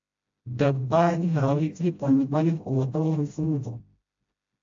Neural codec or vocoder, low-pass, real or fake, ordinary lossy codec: codec, 16 kHz, 0.5 kbps, FreqCodec, smaller model; 7.2 kHz; fake; AAC, 48 kbps